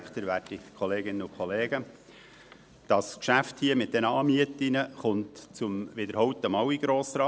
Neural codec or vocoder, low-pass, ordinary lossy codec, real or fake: none; none; none; real